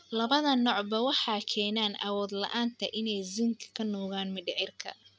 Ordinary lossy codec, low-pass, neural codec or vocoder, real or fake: none; none; none; real